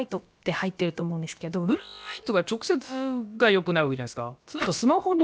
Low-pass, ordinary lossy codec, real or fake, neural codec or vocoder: none; none; fake; codec, 16 kHz, about 1 kbps, DyCAST, with the encoder's durations